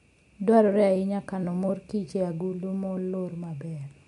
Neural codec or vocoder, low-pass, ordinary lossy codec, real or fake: none; 10.8 kHz; MP3, 64 kbps; real